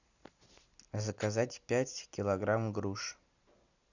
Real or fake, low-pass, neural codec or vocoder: real; 7.2 kHz; none